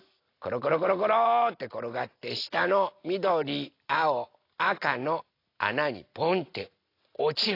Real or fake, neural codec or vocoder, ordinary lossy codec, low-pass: real; none; AAC, 32 kbps; 5.4 kHz